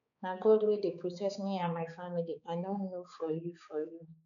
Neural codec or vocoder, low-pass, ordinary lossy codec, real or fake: codec, 16 kHz, 4 kbps, X-Codec, HuBERT features, trained on balanced general audio; 7.2 kHz; none; fake